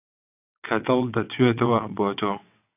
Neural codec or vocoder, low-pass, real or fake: vocoder, 22.05 kHz, 80 mel bands, WaveNeXt; 3.6 kHz; fake